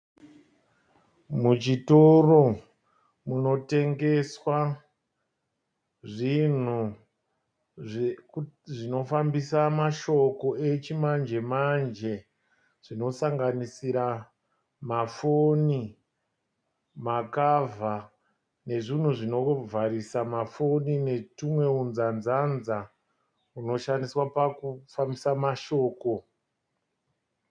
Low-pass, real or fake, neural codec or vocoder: 9.9 kHz; real; none